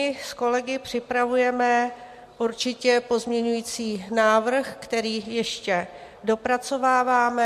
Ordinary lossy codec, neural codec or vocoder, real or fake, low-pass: MP3, 64 kbps; none; real; 14.4 kHz